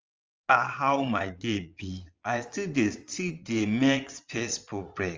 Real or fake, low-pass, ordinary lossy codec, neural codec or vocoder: fake; 7.2 kHz; Opus, 32 kbps; vocoder, 22.05 kHz, 80 mel bands, Vocos